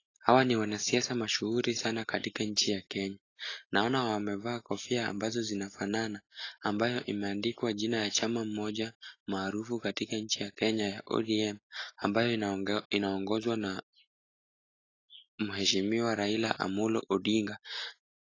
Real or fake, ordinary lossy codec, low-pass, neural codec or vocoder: real; AAC, 32 kbps; 7.2 kHz; none